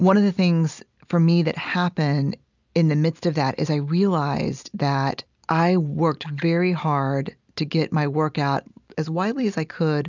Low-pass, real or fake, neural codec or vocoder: 7.2 kHz; real; none